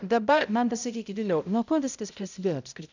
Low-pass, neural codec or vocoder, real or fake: 7.2 kHz; codec, 16 kHz, 0.5 kbps, X-Codec, HuBERT features, trained on balanced general audio; fake